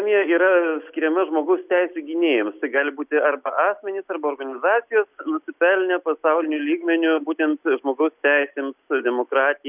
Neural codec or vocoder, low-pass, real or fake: none; 3.6 kHz; real